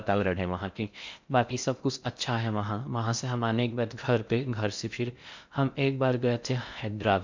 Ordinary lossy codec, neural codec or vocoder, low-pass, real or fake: MP3, 64 kbps; codec, 16 kHz in and 24 kHz out, 0.6 kbps, FocalCodec, streaming, 4096 codes; 7.2 kHz; fake